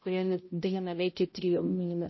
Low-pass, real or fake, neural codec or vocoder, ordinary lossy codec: 7.2 kHz; fake; codec, 16 kHz, 0.5 kbps, X-Codec, HuBERT features, trained on balanced general audio; MP3, 24 kbps